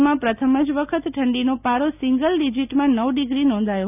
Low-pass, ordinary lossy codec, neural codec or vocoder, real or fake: 3.6 kHz; none; none; real